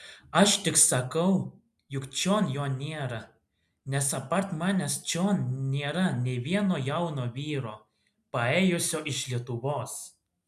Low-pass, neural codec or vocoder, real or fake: 14.4 kHz; none; real